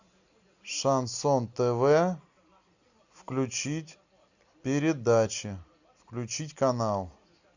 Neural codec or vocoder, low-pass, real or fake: none; 7.2 kHz; real